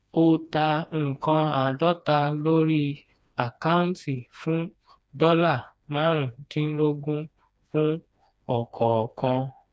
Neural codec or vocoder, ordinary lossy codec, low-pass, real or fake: codec, 16 kHz, 2 kbps, FreqCodec, smaller model; none; none; fake